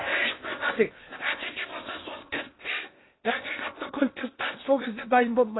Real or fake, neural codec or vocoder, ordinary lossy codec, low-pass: fake; codec, 16 kHz in and 24 kHz out, 0.8 kbps, FocalCodec, streaming, 65536 codes; AAC, 16 kbps; 7.2 kHz